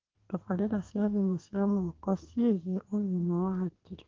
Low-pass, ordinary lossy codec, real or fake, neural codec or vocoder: 7.2 kHz; Opus, 16 kbps; fake; codec, 32 kHz, 1.9 kbps, SNAC